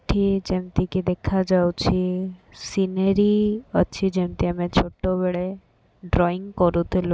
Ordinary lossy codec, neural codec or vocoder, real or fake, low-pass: none; none; real; none